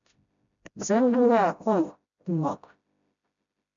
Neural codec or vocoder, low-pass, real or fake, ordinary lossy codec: codec, 16 kHz, 0.5 kbps, FreqCodec, smaller model; 7.2 kHz; fake; MP3, 96 kbps